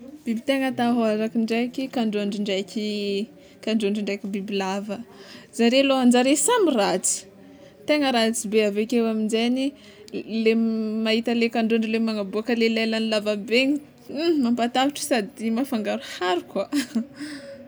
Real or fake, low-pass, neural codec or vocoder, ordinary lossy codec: real; none; none; none